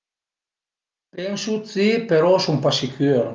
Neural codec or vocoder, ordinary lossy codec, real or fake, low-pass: none; Opus, 32 kbps; real; 7.2 kHz